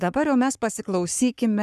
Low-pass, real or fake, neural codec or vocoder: 14.4 kHz; fake; codec, 44.1 kHz, 7.8 kbps, Pupu-Codec